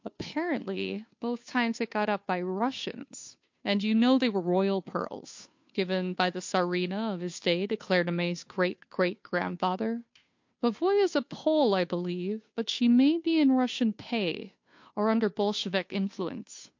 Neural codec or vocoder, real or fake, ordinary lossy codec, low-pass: codec, 16 kHz, 2 kbps, FunCodec, trained on Chinese and English, 25 frames a second; fake; MP3, 48 kbps; 7.2 kHz